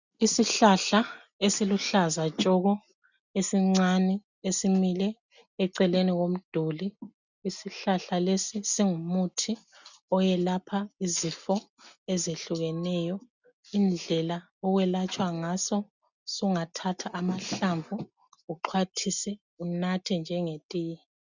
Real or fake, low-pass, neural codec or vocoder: real; 7.2 kHz; none